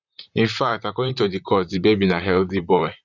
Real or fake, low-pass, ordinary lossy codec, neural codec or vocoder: fake; 7.2 kHz; none; vocoder, 44.1 kHz, 128 mel bands, Pupu-Vocoder